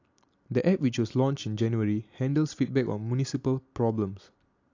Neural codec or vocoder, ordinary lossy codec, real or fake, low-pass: none; AAC, 48 kbps; real; 7.2 kHz